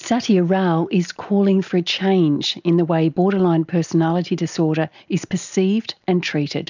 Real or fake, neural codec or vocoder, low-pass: real; none; 7.2 kHz